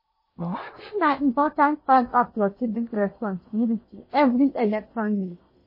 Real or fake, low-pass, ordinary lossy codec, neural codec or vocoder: fake; 5.4 kHz; MP3, 24 kbps; codec, 16 kHz in and 24 kHz out, 0.8 kbps, FocalCodec, streaming, 65536 codes